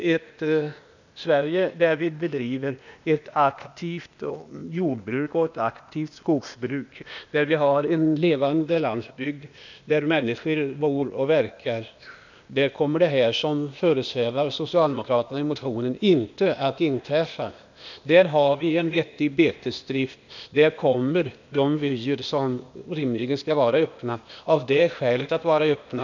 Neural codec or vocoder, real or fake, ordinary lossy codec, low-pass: codec, 16 kHz, 0.8 kbps, ZipCodec; fake; none; 7.2 kHz